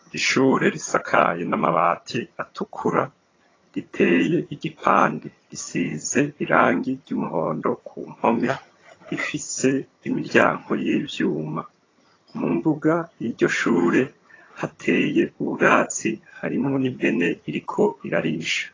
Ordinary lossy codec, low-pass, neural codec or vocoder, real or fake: AAC, 32 kbps; 7.2 kHz; vocoder, 22.05 kHz, 80 mel bands, HiFi-GAN; fake